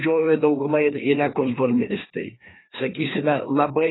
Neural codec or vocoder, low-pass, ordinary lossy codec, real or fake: codec, 16 kHz, 2 kbps, FreqCodec, larger model; 7.2 kHz; AAC, 16 kbps; fake